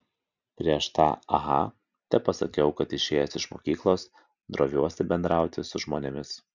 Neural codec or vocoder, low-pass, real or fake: none; 7.2 kHz; real